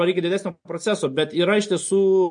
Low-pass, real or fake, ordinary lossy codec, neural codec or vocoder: 9.9 kHz; real; MP3, 48 kbps; none